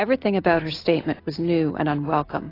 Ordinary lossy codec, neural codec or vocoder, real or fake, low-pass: AAC, 24 kbps; none; real; 5.4 kHz